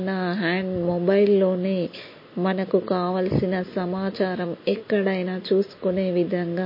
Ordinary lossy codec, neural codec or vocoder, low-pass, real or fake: MP3, 24 kbps; none; 5.4 kHz; real